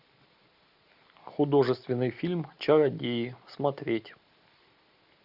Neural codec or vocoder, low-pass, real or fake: none; 5.4 kHz; real